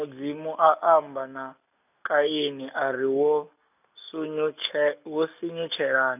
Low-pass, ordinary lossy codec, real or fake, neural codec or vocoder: 3.6 kHz; none; real; none